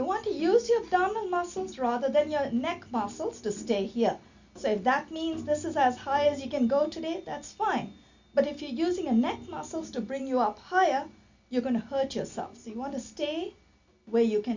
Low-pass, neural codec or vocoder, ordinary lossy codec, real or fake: 7.2 kHz; none; Opus, 64 kbps; real